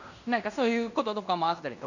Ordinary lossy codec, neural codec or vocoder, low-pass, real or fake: none; codec, 16 kHz in and 24 kHz out, 0.9 kbps, LongCat-Audio-Codec, fine tuned four codebook decoder; 7.2 kHz; fake